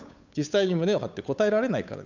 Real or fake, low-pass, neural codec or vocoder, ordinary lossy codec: fake; 7.2 kHz; codec, 16 kHz, 8 kbps, FunCodec, trained on Chinese and English, 25 frames a second; none